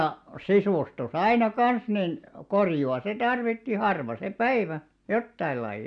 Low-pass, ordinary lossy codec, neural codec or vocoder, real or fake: 9.9 kHz; MP3, 96 kbps; none; real